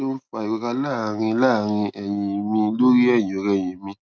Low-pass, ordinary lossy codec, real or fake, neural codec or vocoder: none; none; real; none